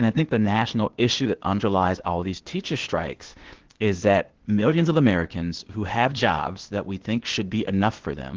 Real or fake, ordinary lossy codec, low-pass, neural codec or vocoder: fake; Opus, 16 kbps; 7.2 kHz; codec, 16 kHz, 0.8 kbps, ZipCodec